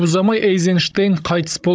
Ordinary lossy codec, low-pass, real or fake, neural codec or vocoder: none; none; fake; codec, 16 kHz, 16 kbps, FunCodec, trained on Chinese and English, 50 frames a second